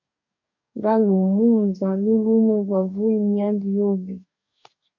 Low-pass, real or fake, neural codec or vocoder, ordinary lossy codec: 7.2 kHz; fake; codec, 44.1 kHz, 2.6 kbps, DAC; MP3, 48 kbps